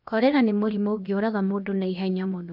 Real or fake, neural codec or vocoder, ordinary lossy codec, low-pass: fake; codec, 16 kHz, about 1 kbps, DyCAST, with the encoder's durations; none; 5.4 kHz